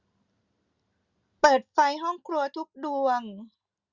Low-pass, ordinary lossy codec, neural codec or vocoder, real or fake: 7.2 kHz; Opus, 64 kbps; none; real